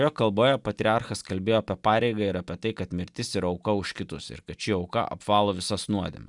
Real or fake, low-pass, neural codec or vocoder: real; 10.8 kHz; none